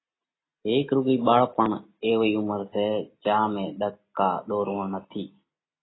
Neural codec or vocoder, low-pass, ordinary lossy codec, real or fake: none; 7.2 kHz; AAC, 16 kbps; real